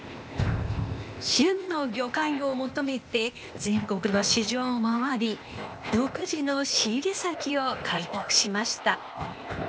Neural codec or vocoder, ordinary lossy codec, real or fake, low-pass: codec, 16 kHz, 0.8 kbps, ZipCodec; none; fake; none